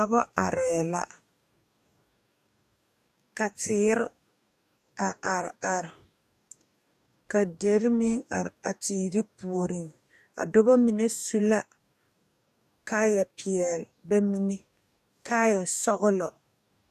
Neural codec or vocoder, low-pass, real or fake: codec, 44.1 kHz, 2.6 kbps, DAC; 14.4 kHz; fake